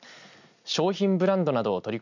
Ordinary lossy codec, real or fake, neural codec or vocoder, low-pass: none; real; none; 7.2 kHz